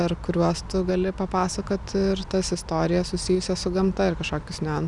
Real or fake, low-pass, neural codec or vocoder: real; 10.8 kHz; none